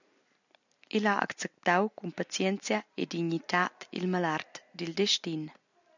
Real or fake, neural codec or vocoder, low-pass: real; none; 7.2 kHz